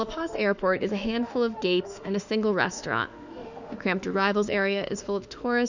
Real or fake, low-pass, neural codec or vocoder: fake; 7.2 kHz; autoencoder, 48 kHz, 32 numbers a frame, DAC-VAE, trained on Japanese speech